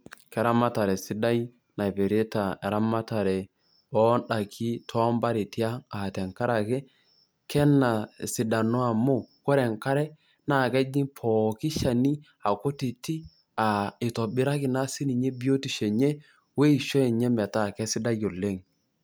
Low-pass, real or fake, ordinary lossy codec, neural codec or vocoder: none; real; none; none